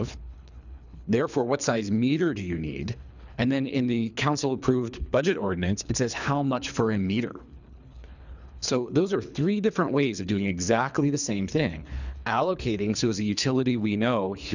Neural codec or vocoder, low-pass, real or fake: codec, 24 kHz, 3 kbps, HILCodec; 7.2 kHz; fake